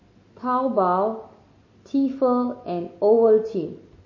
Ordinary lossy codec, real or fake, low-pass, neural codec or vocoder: MP3, 32 kbps; real; 7.2 kHz; none